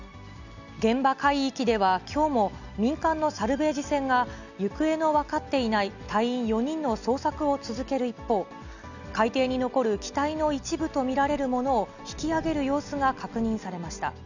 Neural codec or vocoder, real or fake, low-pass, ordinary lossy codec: none; real; 7.2 kHz; none